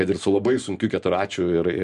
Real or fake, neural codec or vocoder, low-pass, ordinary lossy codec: real; none; 10.8 kHz; MP3, 64 kbps